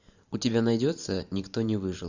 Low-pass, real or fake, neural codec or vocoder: 7.2 kHz; fake; vocoder, 44.1 kHz, 128 mel bands every 512 samples, BigVGAN v2